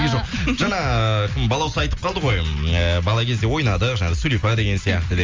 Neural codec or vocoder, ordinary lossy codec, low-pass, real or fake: none; Opus, 32 kbps; 7.2 kHz; real